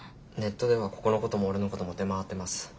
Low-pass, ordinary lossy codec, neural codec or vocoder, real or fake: none; none; none; real